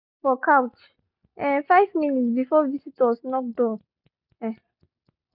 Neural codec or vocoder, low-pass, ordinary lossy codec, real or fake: none; 5.4 kHz; none; real